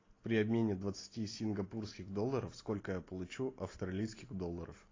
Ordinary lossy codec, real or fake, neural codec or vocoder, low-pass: AAC, 32 kbps; real; none; 7.2 kHz